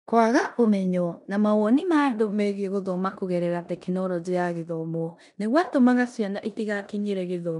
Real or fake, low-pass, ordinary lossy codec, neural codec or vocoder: fake; 10.8 kHz; none; codec, 16 kHz in and 24 kHz out, 0.9 kbps, LongCat-Audio-Codec, four codebook decoder